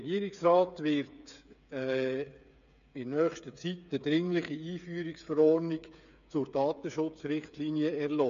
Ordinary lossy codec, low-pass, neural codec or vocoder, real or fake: none; 7.2 kHz; codec, 16 kHz, 8 kbps, FreqCodec, smaller model; fake